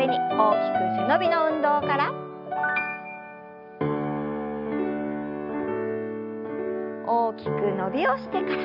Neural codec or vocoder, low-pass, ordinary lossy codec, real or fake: none; 5.4 kHz; none; real